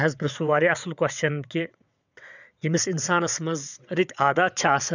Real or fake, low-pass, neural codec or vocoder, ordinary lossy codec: fake; 7.2 kHz; vocoder, 22.05 kHz, 80 mel bands, WaveNeXt; none